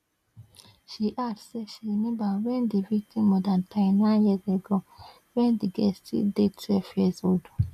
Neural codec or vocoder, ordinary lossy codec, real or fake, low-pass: none; none; real; 14.4 kHz